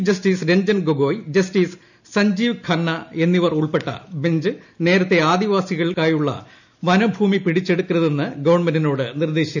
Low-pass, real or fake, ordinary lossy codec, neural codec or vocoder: 7.2 kHz; real; none; none